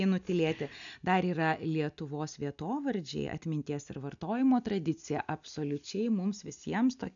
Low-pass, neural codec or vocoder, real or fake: 7.2 kHz; none; real